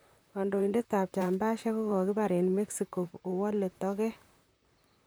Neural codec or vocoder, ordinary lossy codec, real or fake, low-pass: vocoder, 44.1 kHz, 128 mel bands, Pupu-Vocoder; none; fake; none